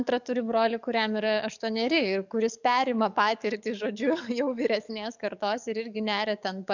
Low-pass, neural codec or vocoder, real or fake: 7.2 kHz; codec, 44.1 kHz, 7.8 kbps, DAC; fake